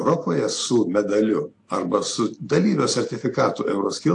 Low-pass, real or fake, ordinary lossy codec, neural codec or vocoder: 10.8 kHz; real; AAC, 48 kbps; none